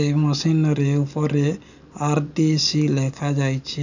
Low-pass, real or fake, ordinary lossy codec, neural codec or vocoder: 7.2 kHz; real; none; none